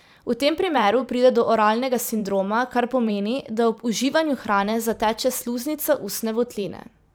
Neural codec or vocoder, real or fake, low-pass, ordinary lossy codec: vocoder, 44.1 kHz, 128 mel bands every 512 samples, BigVGAN v2; fake; none; none